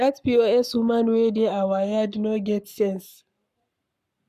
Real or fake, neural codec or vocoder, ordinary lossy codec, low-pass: fake; codec, 44.1 kHz, 7.8 kbps, Pupu-Codec; none; 14.4 kHz